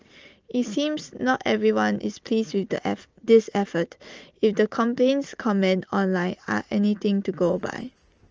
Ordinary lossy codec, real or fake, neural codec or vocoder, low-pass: Opus, 24 kbps; real; none; 7.2 kHz